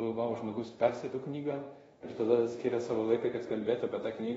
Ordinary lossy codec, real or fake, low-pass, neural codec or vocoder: AAC, 24 kbps; fake; 10.8 kHz; codec, 24 kHz, 0.5 kbps, DualCodec